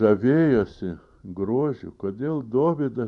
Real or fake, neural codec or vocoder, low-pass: fake; vocoder, 44.1 kHz, 128 mel bands every 512 samples, BigVGAN v2; 10.8 kHz